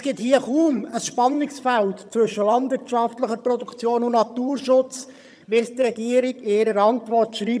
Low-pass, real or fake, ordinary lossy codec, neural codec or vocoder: none; fake; none; vocoder, 22.05 kHz, 80 mel bands, HiFi-GAN